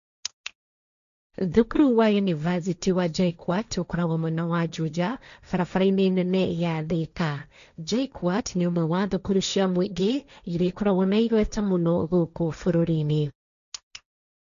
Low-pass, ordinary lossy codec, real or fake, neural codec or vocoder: 7.2 kHz; none; fake; codec, 16 kHz, 1.1 kbps, Voila-Tokenizer